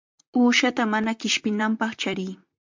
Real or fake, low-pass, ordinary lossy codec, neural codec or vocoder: fake; 7.2 kHz; MP3, 64 kbps; vocoder, 44.1 kHz, 128 mel bands, Pupu-Vocoder